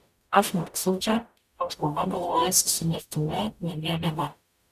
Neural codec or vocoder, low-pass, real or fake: codec, 44.1 kHz, 0.9 kbps, DAC; 14.4 kHz; fake